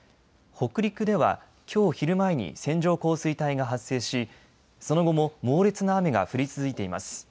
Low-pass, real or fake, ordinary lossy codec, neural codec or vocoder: none; real; none; none